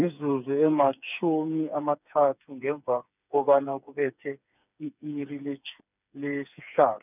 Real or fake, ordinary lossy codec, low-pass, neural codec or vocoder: fake; none; 3.6 kHz; codec, 16 kHz, 4 kbps, FreqCodec, smaller model